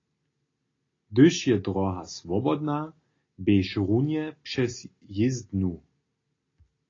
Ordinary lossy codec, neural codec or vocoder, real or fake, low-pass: AAC, 32 kbps; none; real; 7.2 kHz